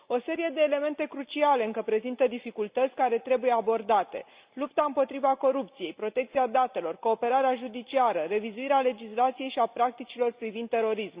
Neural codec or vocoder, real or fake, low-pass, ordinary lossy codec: none; real; 3.6 kHz; Opus, 64 kbps